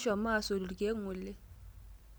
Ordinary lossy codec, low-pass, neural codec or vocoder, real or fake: none; none; none; real